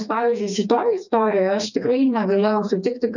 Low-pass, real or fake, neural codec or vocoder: 7.2 kHz; fake; codec, 16 kHz, 2 kbps, FreqCodec, smaller model